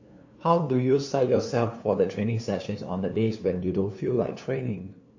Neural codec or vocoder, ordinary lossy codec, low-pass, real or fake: codec, 16 kHz, 2 kbps, FunCodec, trained on LibriTTS, 25 frames a second; AAC, 48 kbps; 7.2 kHz; fake